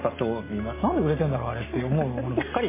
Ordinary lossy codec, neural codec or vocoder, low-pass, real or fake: MP3, 16 kbps; none; 3.6 kHz; real